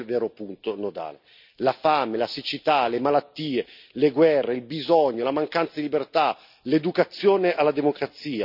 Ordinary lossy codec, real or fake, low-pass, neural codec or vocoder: none; real; 5.4 kHz; none